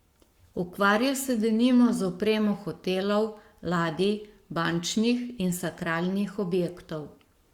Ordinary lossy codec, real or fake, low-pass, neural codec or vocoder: Opus, 64 kbps; fake; 19.8 kHz; codec, 44.1 kHz, 7.8 kbps, Pupu-Codec